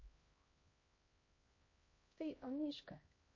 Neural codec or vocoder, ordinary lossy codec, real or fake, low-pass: codec, 16 kHz, 1 kbps, X-Codec, HuBERT features, trained on LibriSpeech; MP3, 32 kbps; fake; 7.2 kHz